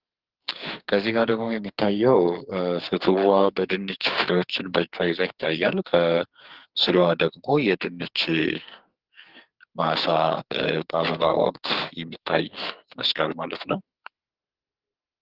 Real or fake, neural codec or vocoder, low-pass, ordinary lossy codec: fake; codec, 44.1 kHz, 2.6 kbps, SNAC; 5.4 kHz; Opus, 16 kbps